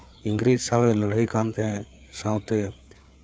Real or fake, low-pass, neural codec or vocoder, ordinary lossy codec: fake; none; codec, 16 kHz, 4 kbps, FreqCodec, larger model; none